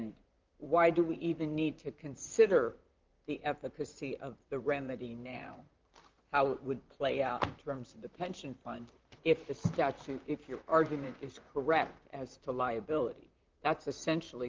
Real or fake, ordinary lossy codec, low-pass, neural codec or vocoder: fake; Opus, 32 kbps; 7.2 kHz; vocoder, 44.1 kHz, 128 mel bands, Pupu-Vocoder